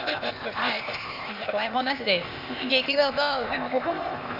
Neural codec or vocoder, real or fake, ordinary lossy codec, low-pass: codec, 16 kHz, 0.8 kbps, ZipCodec; fake; MP3, 48 kbps; 5.4 kHz